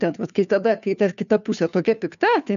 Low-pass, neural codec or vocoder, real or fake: 7.2 kHz; codec, 16 kHz, 2 kbps, FunCodec, trained on Chinese and English, 25 frames a second; fake